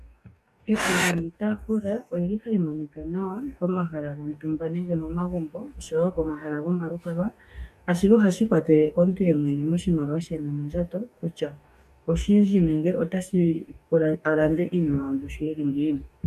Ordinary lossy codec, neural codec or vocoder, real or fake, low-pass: AAC, 96 kbps; codec, 44.1 kHz, 2.6 kbps, DAC; fake; 14.4 kHz